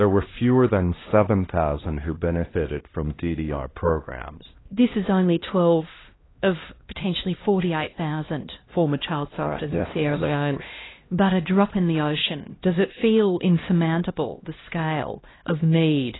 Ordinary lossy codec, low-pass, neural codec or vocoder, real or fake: AAC, 16 kbps; 7.2 kHz; codec, 16 kHz, 1 kbps, X-Codec, HuBERT features, trained on LibriSpeech; fake